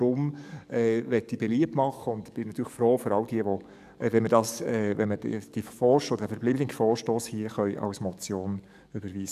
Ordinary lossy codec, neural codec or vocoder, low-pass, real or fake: none; codec, 44.1 kHz, 7.8 kbps, DAC; 14.4 kHz; fake